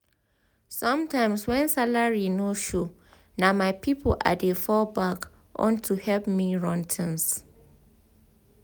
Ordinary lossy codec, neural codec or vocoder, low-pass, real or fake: none; none; none; real